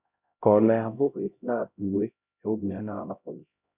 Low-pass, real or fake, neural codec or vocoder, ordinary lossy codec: 3.6 kHz; fake; codec, 16 kHz, 0.5 kbps, X-Codec, HuBERT features, trained on LibriSpeech; none